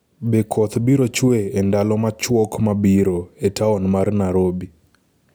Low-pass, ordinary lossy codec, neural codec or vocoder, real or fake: none; none; none; real